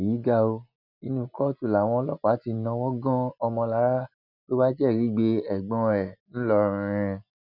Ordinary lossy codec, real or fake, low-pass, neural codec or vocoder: none; real; 5.4 kHz; none